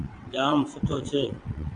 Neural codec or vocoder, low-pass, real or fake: vocoder, 22.05 kHz, 80 mel bands, WaveNeXt; 9.9 kHz; fake